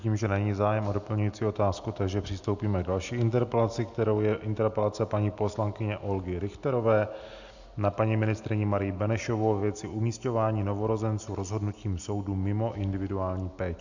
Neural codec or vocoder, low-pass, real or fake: none; 7.2 kHz; real